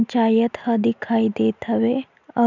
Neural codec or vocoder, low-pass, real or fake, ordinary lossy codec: none; 7.2 kHz; real; none